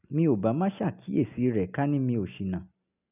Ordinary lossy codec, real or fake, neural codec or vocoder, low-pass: none; real; none; 3.6 kHz